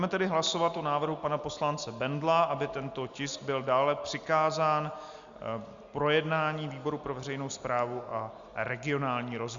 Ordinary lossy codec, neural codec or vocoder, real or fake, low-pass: Opus, 64 kbps; none; real; 7.2 kHz